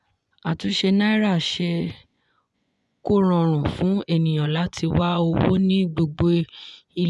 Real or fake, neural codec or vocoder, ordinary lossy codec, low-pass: fake; vocoder, 24 kHz, 100 mel bands, Vocos; none; none